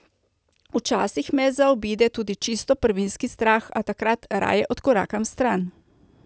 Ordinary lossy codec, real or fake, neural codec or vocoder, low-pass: none; real; none; none